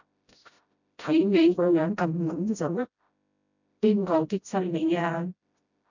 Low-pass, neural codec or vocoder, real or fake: 7.2 kHz; codec, 16 kHz, 0.5 kbps, FreqCodec, smaller model; fake